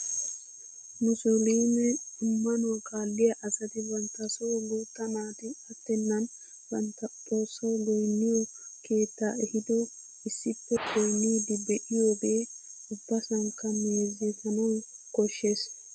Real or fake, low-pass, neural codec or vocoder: real; 9.9 kHz; none